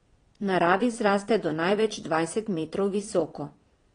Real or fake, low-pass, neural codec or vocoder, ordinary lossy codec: fake; 9.9 kHz; vocoder, 22.05 kHz, 80 mel bands, Vocos; AAC, 32 kbps